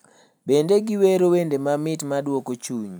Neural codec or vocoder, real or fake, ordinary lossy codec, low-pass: none; real; none; none